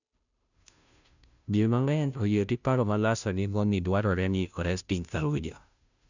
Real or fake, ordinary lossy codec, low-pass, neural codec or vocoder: fake; none; 7.2 kHz; codec, 16 kHz, 0.5 kbps, FunCodec, trained on Chinese and English, 25 frames a second